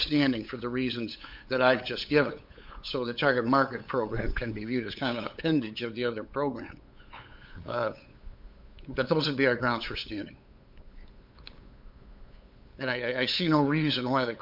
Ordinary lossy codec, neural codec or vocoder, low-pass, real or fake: MP3, 48 kbps; codec, 16 kHz, 8 kbps, FunCodec, trained on LibriTTS, 25 frames a second; 5.4 kHz; fake